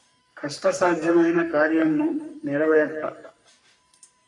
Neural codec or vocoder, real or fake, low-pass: codec, 44.1 kHz, 3.4 kbps, Pupu-Codec; fake; 10.8 kHz